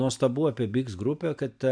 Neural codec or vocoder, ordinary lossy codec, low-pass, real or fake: none; MP3, 64 kbps; 9.9 kHz; real